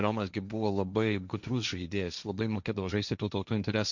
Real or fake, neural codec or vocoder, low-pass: fake; codec, 16 kHz, 1.1 kbps, Voila-Tokenizer; 7.2 kHz